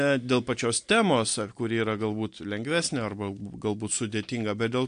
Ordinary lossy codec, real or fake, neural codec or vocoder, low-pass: AAC, 64 kbps; real; none; 9.9 kHz